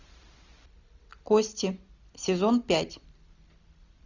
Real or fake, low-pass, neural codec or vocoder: real; 7.2 kHz; none